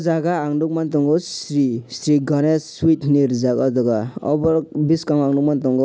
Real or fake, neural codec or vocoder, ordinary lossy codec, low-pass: real; none; none; none